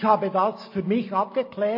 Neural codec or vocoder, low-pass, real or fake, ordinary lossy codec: none; 5.4 kHz; real; MP3, 24 kbps